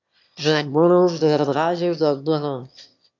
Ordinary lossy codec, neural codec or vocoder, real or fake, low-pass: MP3, 64 kbps; autoencoder, 22.05 kHz, a latent of 192 numbers a frame, VITS, trained on one speaker; fake; 7.2 kHz